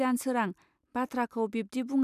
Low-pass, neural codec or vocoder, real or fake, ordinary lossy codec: 14.4 kHz; none; real; none